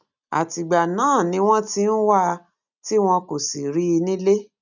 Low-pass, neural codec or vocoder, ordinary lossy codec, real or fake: 7.2 kHz; none; none; real